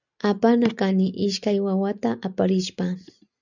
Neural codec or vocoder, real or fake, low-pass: none; real; 7.2 kHz